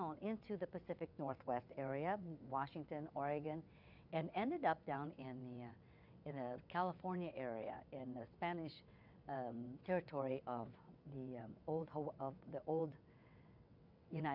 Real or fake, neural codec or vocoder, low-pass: fake; vocoder, 22.05 kHz, 80 mel bands, WaveNeXt; 5.4 kHz